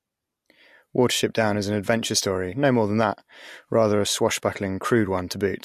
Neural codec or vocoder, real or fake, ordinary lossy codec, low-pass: none; real; MP3, 64 kbps; 14.4 kHz